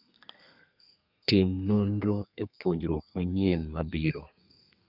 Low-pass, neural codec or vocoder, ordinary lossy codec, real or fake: 5.4 kHz; codec, 32 kHz, 1.9 kbps, SNAC; none; fake